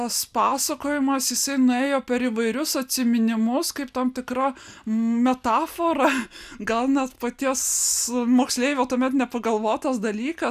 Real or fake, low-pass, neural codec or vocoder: real; 14.4 kHz; none